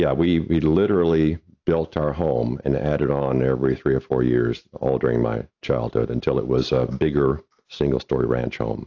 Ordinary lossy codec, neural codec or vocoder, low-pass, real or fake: AAC, 48 kbps; none; 7.2 kHz; real